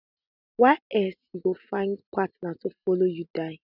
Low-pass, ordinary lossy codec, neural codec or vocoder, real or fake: 5.4 kHz; none; none; real